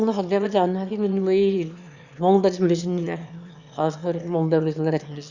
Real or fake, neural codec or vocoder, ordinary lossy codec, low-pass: fake; autoencoder, 22.05 kHz, a latent of 192 numbers a frame, VITS, trained on one speaker; Opus, 64 kbps; 7.2 kHz